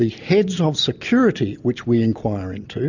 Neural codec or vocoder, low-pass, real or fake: none; 7.2 kHz; real